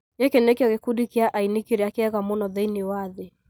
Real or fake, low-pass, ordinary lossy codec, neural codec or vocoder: real; none; none; none